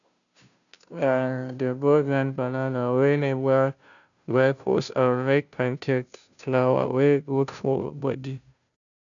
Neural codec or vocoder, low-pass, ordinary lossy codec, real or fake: codec, 16 kHz, 0.5 kbps, FunCodec, trained on Chinese and English, 25 frames a second; 7.2 kHz; none; fake